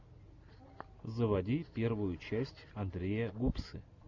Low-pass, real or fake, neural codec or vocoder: 7.2 kHz; real; none